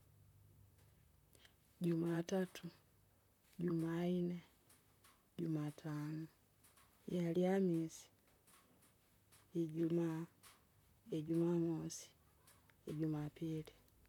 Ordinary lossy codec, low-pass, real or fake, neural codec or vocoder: none; 19.8 kHz; fake; vocoder, 44.1 kHz, 128 mel bands, Pupu-Vocoder